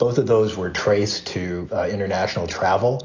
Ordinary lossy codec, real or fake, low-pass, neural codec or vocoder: AAC, 48 kbps; real; 7.2 kHz; none